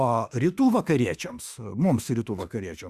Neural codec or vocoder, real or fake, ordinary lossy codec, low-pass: autoencoder, 48 kHz, 32 numbers a frame, DAC-VAE, trained on Japanese speech; fake; Opus, 64 kbps; 14.4 kHz